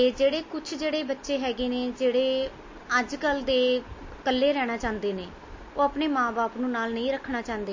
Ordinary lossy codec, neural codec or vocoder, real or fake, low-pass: MP3, 32 kbps; none; real; 7.2 kHz